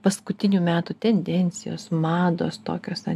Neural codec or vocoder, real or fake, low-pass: none; real; 14.4 kHz